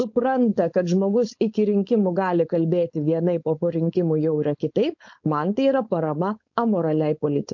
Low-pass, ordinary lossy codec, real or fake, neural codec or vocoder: 7.2 kHz; MP3, 48 kbps; fake; codec, 16 kHz, 4.8 kbps, FACodec